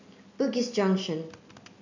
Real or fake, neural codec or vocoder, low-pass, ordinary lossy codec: real; none; 7.2 kHz; none